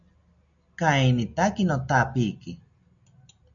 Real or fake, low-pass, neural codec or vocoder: real; 7.2 kHz; none